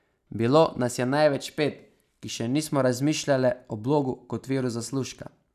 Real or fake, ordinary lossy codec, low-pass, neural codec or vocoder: real; none; 14.4 kHz; none